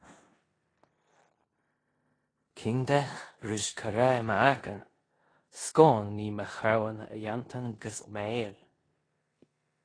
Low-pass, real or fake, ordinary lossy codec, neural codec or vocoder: 9.9 kHz; fake; AAC, 32 kbps; codec, 16 kHz in and 24 kHz out, 0.9 kbps, LongCat-Audio-Codec, four codebook decoder